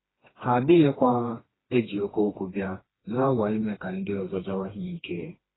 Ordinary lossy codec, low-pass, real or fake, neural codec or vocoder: AAC, 16 kbps; 7.2 kHz; fake; codec, 16 kHz, 2 kbps, FreqCodec, smaller model